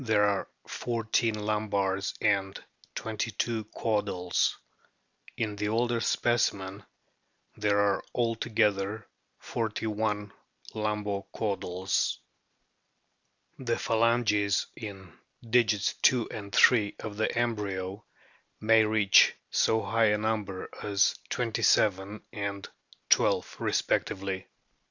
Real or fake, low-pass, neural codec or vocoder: real; 7.2 kHz; none